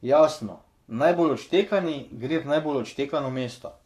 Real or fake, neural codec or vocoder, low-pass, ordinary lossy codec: fake; codec, 44.1 kHz, 7.8 kbps, Pupu-Codec; 14.4 kHz; none